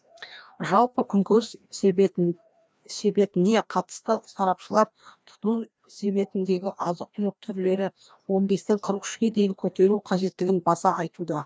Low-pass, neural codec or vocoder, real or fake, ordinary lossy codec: none; codec, 16 kHz, 1 kbps, FreqCodec, larger model; fake; none